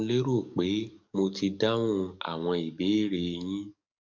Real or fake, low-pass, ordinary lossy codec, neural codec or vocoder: fake; 7.2 kHz; none; codec, 44.1 kHz, 7.8 kbps, DAC